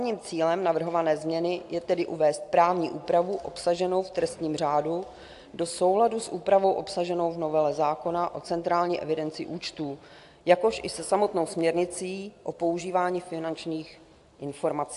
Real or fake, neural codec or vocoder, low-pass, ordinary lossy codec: real; none; 10.8 kHz; AAC, 64 kbps